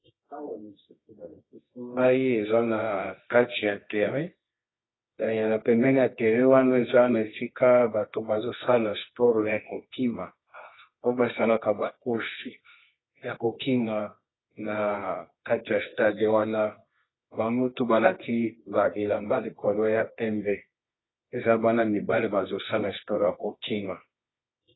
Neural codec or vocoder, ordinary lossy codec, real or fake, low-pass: codec, 24 kHz, 0.9 kbps, WavTokenizer, medium music audio release; AAC, 16 kbps; fake; 7.2 kHz